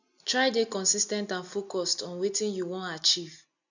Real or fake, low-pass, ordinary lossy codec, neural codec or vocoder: real; 7.2 kHz; none; none